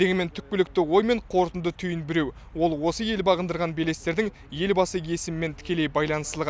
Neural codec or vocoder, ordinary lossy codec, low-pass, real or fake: none; none; none; real